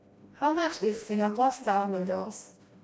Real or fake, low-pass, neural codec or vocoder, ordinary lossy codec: fake; none; codec, 16 kHz, 1 kbps, FreqCodec, smaller model; none